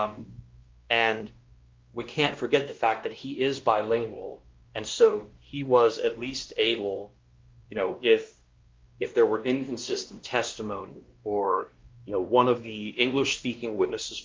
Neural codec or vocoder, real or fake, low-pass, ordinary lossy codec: codec, 16 kHz, 1 kbps, X-Codec, WavLM features, trained on Multilingual LibriSpeech; fake; 7.2 kHz; Opus, 32 kbps